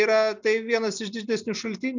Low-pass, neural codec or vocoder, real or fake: 7.2 kHz; none; real